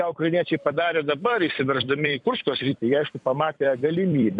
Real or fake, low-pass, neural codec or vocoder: fake; 10.8 kHz; vocoder, 44.1 kHz, 128 mel bands every 256 samples, BigVGAN v2